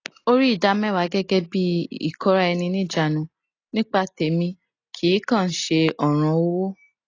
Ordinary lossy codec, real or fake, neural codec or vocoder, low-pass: AAC, 32 kbps; real; none; 7.2 kHz